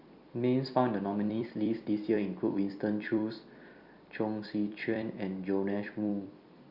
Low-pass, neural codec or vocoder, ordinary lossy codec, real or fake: 5.4 kHz; vocoder, 44.1 kHz, 128 mel bands every 256 samples, BigVGAN v2; Opus, 64 kbps; fake